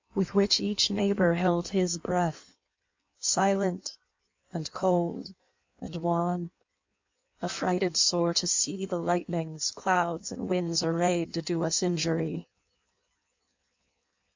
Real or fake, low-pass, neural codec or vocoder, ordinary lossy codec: fake; 7.2 kHz; codec, 16 kHz in and 24 kHz out, 1.1 kbps, FireRedTTS-2 codec; MP3, 64 kbps